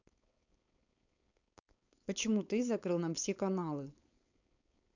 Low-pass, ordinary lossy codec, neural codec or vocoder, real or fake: 7.2 kHz; none; codec, 16 kHz, 4.8 kbps, FACodec; fake